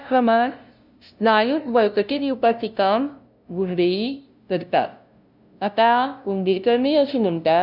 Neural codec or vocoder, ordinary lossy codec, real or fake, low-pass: codec, 16 kHz, 0.5 kbps, FunCodec, trained on LibriTTS, 25 frames a second; none; fake; 5.4 kHz